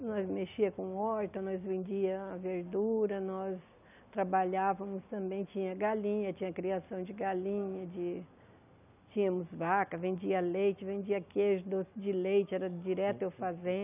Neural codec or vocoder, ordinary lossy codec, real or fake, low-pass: none; none; real; 3.6 kHz